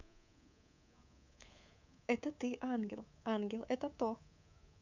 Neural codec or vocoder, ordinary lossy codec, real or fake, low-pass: codec, 24 kHz, 3.1 kbps, DualCodec; none; fake; 7.2 kHz